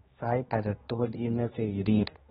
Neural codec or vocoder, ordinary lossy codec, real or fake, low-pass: codec, 16 kHz, 1 kbps, X-Codec, HuBERT features, trained on general audio; AAC, 16 kbps; fake; 7.2 kHz